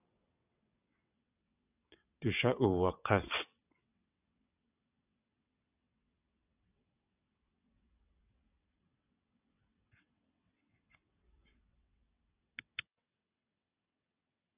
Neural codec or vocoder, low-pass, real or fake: vocoder, 22.05 kHz, 80 mel bands, WaveNeXt; 3.6 kHz; fake